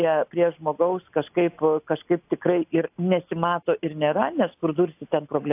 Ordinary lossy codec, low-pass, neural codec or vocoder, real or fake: AAC, 32 kbps; 3.6 kHz; none; real